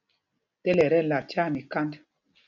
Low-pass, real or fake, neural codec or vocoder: 7.2 kHz; real; none